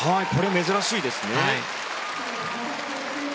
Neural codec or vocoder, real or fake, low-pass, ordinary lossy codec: none; real; none; none